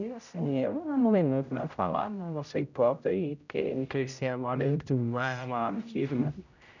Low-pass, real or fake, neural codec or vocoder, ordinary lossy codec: 7.2 kHz; fake; codec, 16 kHz, 0.5 kbps, X-Codec, HuBERT features, trained on general audio; none